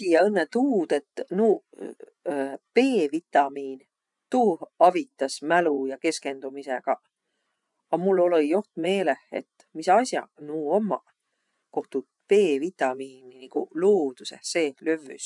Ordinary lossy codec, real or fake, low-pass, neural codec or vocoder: none; fake; 10.8 kHz; vocoder, 24 kHz, 100 mel bands, Vocos